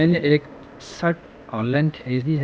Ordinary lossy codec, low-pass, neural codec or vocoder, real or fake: none; none; codec, 16 kHz, 0.8 kbps, ZipCodec; fake